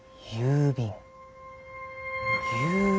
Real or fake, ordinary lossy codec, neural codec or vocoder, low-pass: real; none; none; none